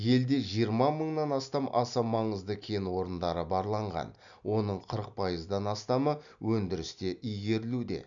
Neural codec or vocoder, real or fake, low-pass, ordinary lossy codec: none; real; 7.2 kHz; none